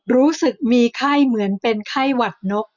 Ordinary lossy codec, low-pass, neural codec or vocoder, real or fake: none; 7.2 kHz; none; real